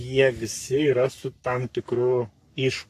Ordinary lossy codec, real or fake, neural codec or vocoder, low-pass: AAC, 64 kbps; fake; codec, 44.1 kHz, 3.4 kbps, Pupu-Codec; 14.4 kHz